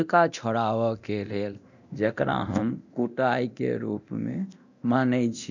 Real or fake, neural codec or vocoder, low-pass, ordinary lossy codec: fake; codec, 24 kHz, 0.9 kbps, DualCodec; 7.2 kHz; none